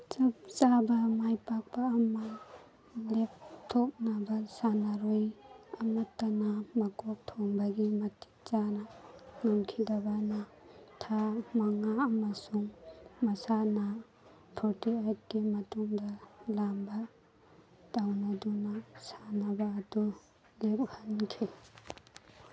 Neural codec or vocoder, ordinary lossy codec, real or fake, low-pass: none; none; real; none